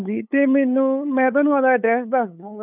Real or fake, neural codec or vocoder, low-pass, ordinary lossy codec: fake; codec, 16 kHz, 8 kbps, FunCodec, trained on LibriTTS, 25 frames a second; 3.6 kHz; none